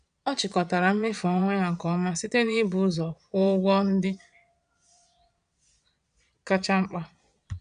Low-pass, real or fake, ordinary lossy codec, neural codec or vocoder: 9.9 kHz; fake; none; vocoder, 22.05 kHz, 80 mel bands, Vocos